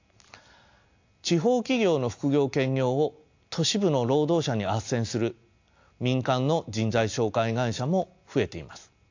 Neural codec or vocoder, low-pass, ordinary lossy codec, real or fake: none; 7.2 kHz; AAC, 48 kbps; real